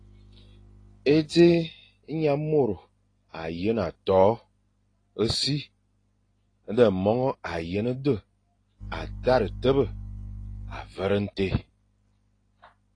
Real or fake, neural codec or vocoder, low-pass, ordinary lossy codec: real; none; 9.9 kHz; AAC, 32 kbps